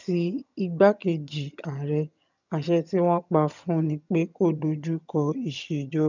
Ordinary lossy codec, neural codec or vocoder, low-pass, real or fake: none; vocoder, 22.05 kHz, 80 mel bands, HiFi-GAN; 7.2 kHz; fake